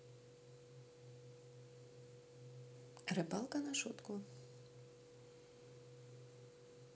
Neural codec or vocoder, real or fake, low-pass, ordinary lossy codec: none; real; none; none